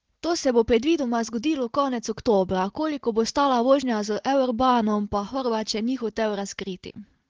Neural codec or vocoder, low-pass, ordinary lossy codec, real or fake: none; 7.2 kHz; Opus, 16 kbps; real